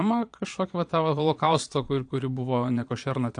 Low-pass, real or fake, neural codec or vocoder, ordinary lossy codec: 9.9 kHz; fake; vocoder, 22.05 kHz, 80 mel bands, Vocos; AAC, 64 kbps